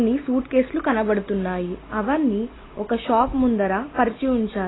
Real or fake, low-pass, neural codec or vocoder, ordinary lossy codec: real; 7.2 kHz; none; AAC, 16 kbps